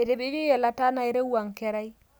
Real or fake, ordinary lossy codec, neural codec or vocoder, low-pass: real; none; none; none